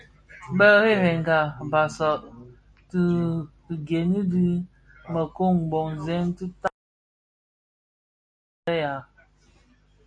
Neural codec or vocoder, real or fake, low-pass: none; real; 9.9 kHz